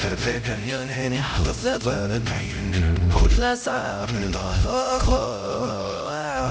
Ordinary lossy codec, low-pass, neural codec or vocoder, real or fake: none; none; codec, 16 kHz, 0.5 kbps, X-Codec, HuBERT features, trained on LibriSpeech; fake